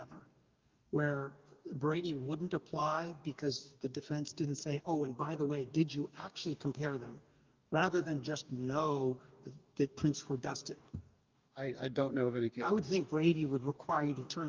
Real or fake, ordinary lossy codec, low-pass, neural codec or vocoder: fake; Opus, 24 kbps; 7.2 kHz; codec, 44.1 kHz, 2.6 kbps, DAC